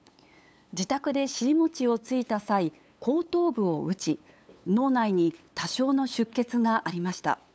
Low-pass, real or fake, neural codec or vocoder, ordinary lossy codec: none; fake; codec, 16 kHz, 8 kbps, FunCodec, trained on LibriTTS, 25 frames a second; none